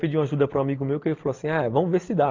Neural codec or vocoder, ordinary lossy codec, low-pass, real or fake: none; Opus, 24 kbps; 7.2 kHz; real